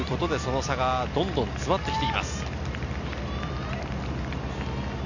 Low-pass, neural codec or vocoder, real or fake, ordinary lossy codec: 7.2 kHz; none; real; none